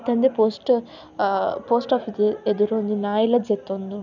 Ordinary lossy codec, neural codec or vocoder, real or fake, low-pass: none; none; real; 7.2 kHz